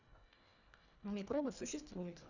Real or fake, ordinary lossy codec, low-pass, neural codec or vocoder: fake; none; 7.2 kHz; codec, 24 kHz, 1.5 kbps, HILCodec